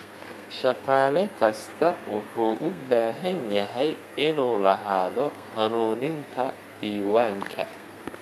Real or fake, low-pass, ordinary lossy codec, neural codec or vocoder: fake; 14.4 kHz; none; codec, 32 kHz, 1.9 kbps, SNAC